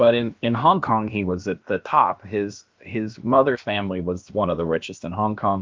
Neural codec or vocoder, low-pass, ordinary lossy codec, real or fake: codec, 16 kHz, about 1 kbps, DyCAST, with the encoder's durations; 7.2 kHz; Opus, 32 kbps; fake